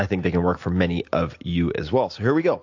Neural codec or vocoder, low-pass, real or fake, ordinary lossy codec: none; 7.2 kHz; real; AAC, 48 kbps